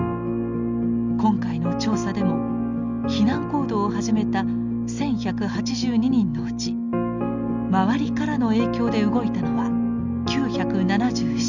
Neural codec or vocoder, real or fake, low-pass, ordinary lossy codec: none; real; 7.2 kHz; none